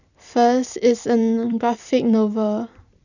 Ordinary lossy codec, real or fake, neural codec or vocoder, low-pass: none; fake; vocoder, 44.1 kHz, 128 mel bands every 256 samples, BigVGAN v2; 7.2 kHz